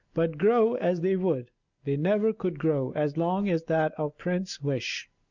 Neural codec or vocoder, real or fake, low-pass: none; real; 7.2 kHz